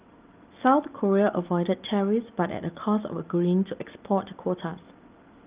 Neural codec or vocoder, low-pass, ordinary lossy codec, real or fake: none; 3.6 kHz; Opus, 32 kbps; real